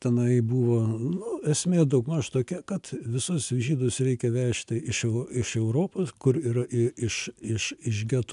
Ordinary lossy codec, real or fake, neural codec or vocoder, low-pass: MP3, 96 kbps; real; none; 10.8 kHz